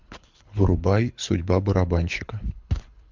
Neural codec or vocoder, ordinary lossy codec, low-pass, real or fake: codec, 24 kHz, 6 kbps, HILCodec; MP3, 64 kbps; 7.2 kHz; fake